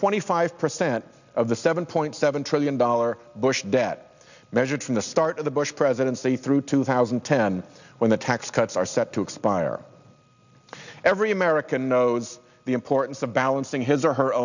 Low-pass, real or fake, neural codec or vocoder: 7.2 kHz; real; none